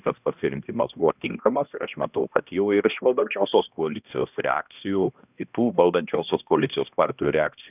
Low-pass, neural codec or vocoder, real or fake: 3.6 kHz; codec, 16 kHz, 1 kbps, X-Codec, HuBERT features, trained on balanced general audio; fake